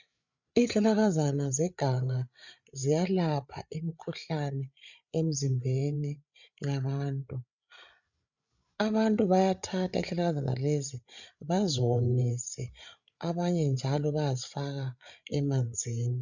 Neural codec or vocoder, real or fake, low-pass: codec, 16 kHz, 8 kbps, FreqCodec, larger model; fake; 7.2 kHz